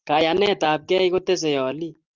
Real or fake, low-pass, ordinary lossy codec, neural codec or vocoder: real; 7.2 kHz; Opus, 32 kbps; none